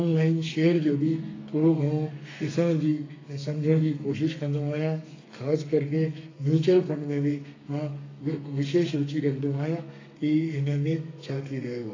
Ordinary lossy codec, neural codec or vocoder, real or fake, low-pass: AAC, 32 kbps; codec, 32 kHz, 1.9 kbps, SNAC; fake; 7.2 kHz